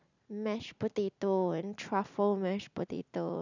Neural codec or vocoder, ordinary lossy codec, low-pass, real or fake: none; none; 7.2 kHz; real